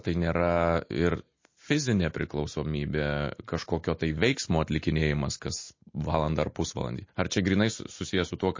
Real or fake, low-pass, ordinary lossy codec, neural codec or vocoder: real; 7.2 kHz; MP3, 32 kbps; none